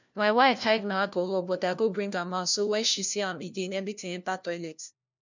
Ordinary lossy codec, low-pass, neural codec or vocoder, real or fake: none; 7.2 kHz; codec, 16 kHz, 1 kbps, FunCodec, trained on LibriTTS, 50 frames a second; fake